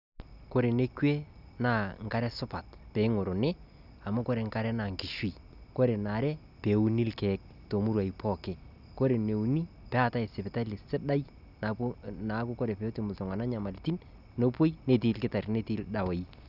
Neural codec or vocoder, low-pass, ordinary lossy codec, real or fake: none; 5.4 kHz; none; real